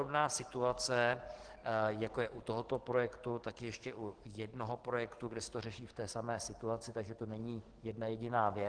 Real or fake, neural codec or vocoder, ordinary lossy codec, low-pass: fake; codec, 44.1 kHz, 7.8 kbps, DAC; Opus, 16 kbps; 9.9 kHz